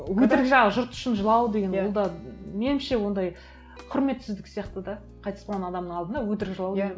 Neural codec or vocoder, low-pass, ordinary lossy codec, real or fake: none; none; none; real